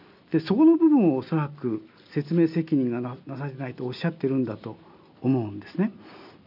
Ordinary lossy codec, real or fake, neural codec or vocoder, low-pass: none; real; none; 5.4 kHz